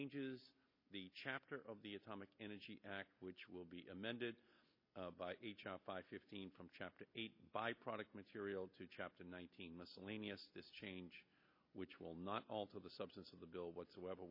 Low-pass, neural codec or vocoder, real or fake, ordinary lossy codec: 5.4 kHz; none; real; MP3, 24 kbps